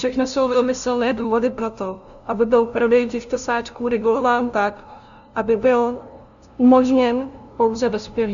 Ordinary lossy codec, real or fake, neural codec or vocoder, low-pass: MP3, 96 kbps; fake; codec, 16 kHz, 0.5 kbps, FunCodec, trained on LibriTTS, 25 frames a second; 7.2 kHz